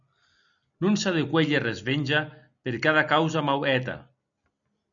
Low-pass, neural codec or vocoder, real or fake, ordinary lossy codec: 7.2 kHz; none; real; MP3, 96 kbps